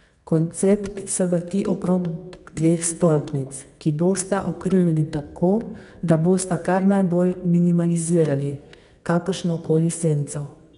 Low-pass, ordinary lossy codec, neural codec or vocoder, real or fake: 10.8 kHz; none; codec, 24 kHz, 0.9 kbps, WavTokenizer, medium music audio release; fake